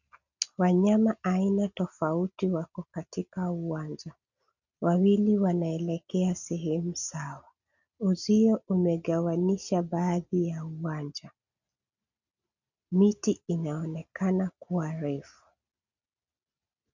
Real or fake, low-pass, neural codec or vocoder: real; 7.2 kHz; none